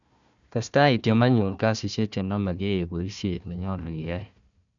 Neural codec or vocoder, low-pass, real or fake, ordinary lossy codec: codec, 16 kHz, 1 kbps, FunCodec, trained on Chinese and English, 50 frames a second; 7.2 kHz; fake; none